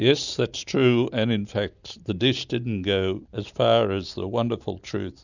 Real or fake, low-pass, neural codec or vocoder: real; 7.2 kHz; none